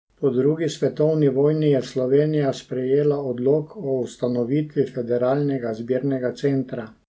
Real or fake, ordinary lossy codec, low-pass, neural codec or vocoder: real; none; none; none